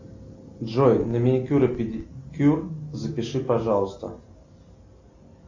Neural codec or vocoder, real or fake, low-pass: none; real; 7.2 kHz